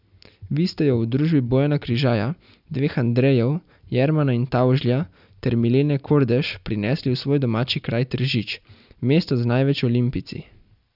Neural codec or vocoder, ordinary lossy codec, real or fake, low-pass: none; none; real; 5.4 kHz